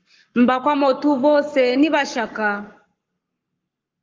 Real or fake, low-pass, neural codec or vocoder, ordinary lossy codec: fake; 7.2 kHz; codec, 44.1 kHz, 7.8 kbps, Pupu-Codec; Opus, 32 kbps